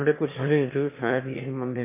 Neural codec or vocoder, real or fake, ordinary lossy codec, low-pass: autoencoder, 22.05 kHz, a latent of 192 numbers a frame, VITS, trained on one speaker; fake; MP3, 24 kbps; 3.6 kHz